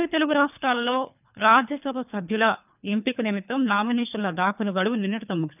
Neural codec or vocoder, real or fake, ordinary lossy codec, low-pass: codec, 24 kHz, 3 kbps, HILCodec; fake; none; 3.6 kHz